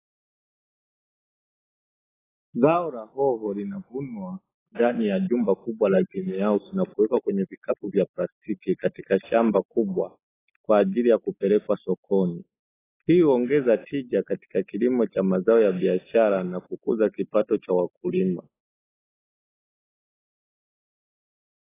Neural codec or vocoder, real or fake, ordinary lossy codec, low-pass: none; real; AAC, 16 kbps; 3.6 kHz